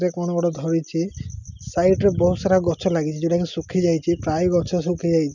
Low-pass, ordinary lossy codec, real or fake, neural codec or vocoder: 7.2 kHz; none; real; none